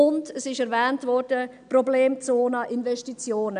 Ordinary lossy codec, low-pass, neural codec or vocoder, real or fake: none; 9.9 kHz; none; real